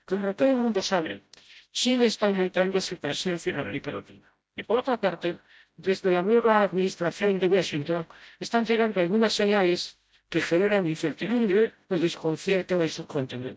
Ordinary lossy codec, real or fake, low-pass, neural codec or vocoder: none; fake; none; codec, 16 kHz, 0.5 kbps, FreqCodec, smaller model